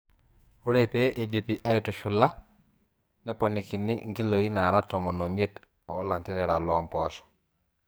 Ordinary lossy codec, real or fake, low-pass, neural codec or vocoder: none; fake; none; codec, 44.1 kHz, 2.6 kbps, SNAC